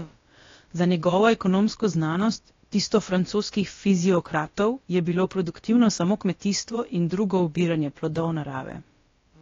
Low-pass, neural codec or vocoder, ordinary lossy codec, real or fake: 7.2 kHz; codec, 16 kHz, about 1 kbps, DyCAST, with the encoder's durations; AAC, 32 kbps; fake